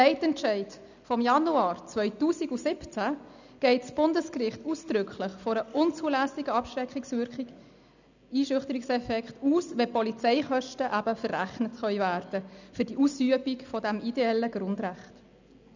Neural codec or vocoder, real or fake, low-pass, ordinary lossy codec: none; real; 7.2 kHz; none